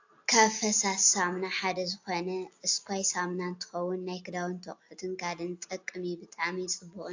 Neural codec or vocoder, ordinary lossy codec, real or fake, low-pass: none; AAC, 48 kbps; real; 7.2 kHz